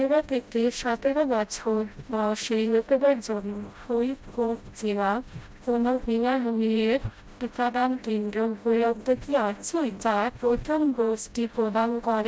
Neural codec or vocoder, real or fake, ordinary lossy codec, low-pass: codec, 16 kHz, 0.5 kbps, FreqCodec, smaller model; fake; none; none